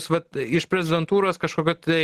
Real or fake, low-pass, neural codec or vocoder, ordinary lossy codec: real; 14.4 kHz; none; Opus, 16 kbps